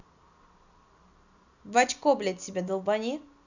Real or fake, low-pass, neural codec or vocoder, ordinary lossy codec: real; 7.2 kHz; none; none